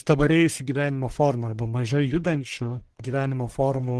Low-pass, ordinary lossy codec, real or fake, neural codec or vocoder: 10.8 kHz; Opus, 16 kbps; fake; codec, 44.1 kHz, 1.7 kbps, Pupu-Codec